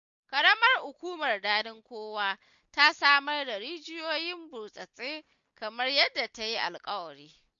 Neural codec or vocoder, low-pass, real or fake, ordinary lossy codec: none; 7.2 kHz; real; MP3, 48 kbps